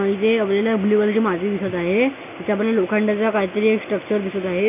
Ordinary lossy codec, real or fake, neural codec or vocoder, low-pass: none; real; none; 3.6 kHz